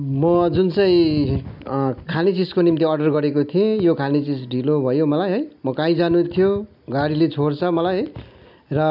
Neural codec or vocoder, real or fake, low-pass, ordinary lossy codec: none; real; 5.4 kHz; none